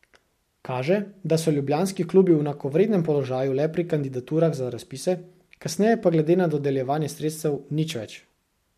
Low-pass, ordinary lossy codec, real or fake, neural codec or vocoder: 14.4 kHz; MP3, 64 kbps; real; none